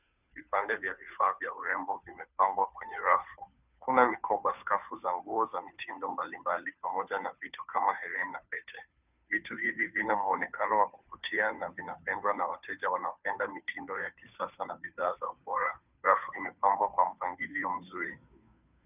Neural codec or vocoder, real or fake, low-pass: codec, 16 kHz, 2 kbps, FunCodec, trained on Chinese and English, 25 frames a second; fake; 3.6 kHz